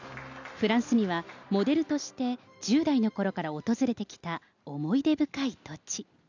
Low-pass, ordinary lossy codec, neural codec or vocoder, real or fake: 7.2 kHz; none; none; real